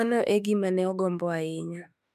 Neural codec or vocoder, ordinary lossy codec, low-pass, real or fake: autoencoder, 48 kHz, 32 numbers a frame, DAC-VAE, trained on Japanese speech; MP3, 96 kbps; 14.4 kHz; fake